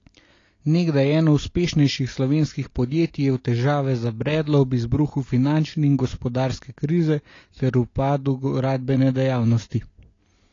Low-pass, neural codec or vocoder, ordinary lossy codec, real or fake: 7.2 kHz; none; AAC, 32 kbps; real